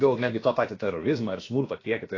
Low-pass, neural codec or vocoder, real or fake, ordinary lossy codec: 7.2 kHz; codec, 16 kHz, about 1 kbps, DyCAST, with the encoder's durations; fake; AAC, 32 kbps